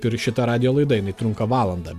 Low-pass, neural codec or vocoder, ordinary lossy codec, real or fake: 14.4 kHz; none; Opus, 64 kbps; real